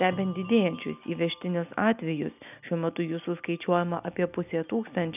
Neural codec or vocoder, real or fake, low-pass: none; real; 3.6 kHz